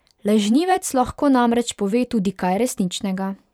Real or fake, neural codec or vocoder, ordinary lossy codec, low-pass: fake; vocoder, 44.1 kHz, 128 mel bands every 512 samples, BigVGAN v2; none; 19.8 kHz